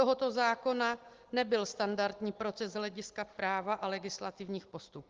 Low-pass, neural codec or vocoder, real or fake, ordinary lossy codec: 7.2 kHz; none; real; Opus, 16 kbps